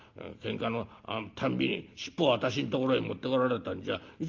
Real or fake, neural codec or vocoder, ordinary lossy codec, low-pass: real; none; Opus, 32 kbps; 7.2 kHz